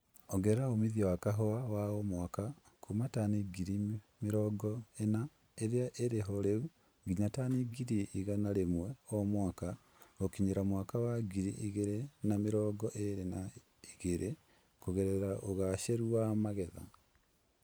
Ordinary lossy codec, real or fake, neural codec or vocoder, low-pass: none; fake; vocoder, 44.1 kHz, 128 mel bands every 512 samples, BigVGAN v2; none